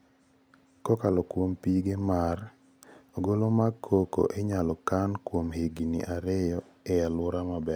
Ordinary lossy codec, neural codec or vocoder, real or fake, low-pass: none; none; real; none